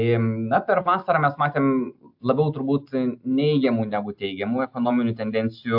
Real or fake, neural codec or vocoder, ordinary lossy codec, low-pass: real; none; AAC, 48 kbps; 5.4 kHz